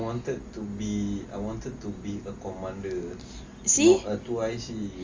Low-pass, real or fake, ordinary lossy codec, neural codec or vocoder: 7.2 kHz; real; Opus, 32 kbps; none